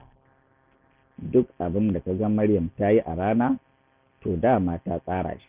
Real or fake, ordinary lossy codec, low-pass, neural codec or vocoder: real; Opus, 64 kbps; 3.6 kHz; none